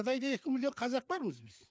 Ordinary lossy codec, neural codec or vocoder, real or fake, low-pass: none; codec, 16 kHz, 4 kbps, FreqCodec, larger model; fake; none